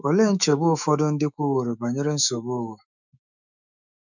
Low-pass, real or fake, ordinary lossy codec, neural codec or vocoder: 7.2 kHz; fake; none; autoencoder, 48 kHz, 128 numbers a frame, DAC-VAE, trained on Japanese speech